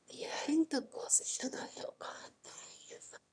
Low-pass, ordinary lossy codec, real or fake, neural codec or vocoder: 9.9 kHz; none; fake; autoencoder, 22.05 kHz, a latent of 192 numbers a frame, VITS, trained on one speaker